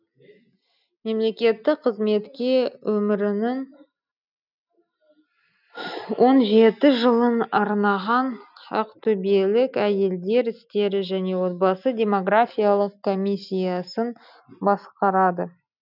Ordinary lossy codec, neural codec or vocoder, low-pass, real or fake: none; none; 5.4 kHz; real